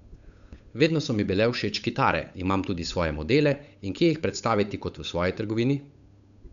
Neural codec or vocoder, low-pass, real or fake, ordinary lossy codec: codec, 16 kHz, 8 kbps, FunCodec, trained on Chinese and English, 25 frames a second; 7.2 kHz; fake; none